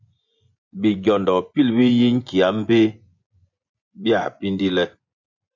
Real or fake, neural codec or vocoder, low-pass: fake; vocoder, 24 kHz, 100 mel bands, Vocos; 7.2 kHz